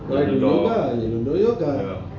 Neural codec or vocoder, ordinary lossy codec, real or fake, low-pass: none; none; real; 7.2 kHz